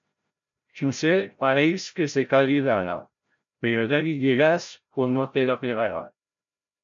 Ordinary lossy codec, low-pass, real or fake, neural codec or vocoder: MP3, 64 kbps; 7.2 kHz; fake; codec, 16 kHz, 0.5 kbps, FreqCodec, larger model